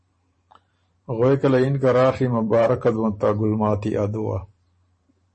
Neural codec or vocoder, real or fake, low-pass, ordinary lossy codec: vocoder, 44.1 kHz, 128 mel bands every 512 samples, BigVGAN v2; fake; 10.8 kHz; MP3, 32 kbps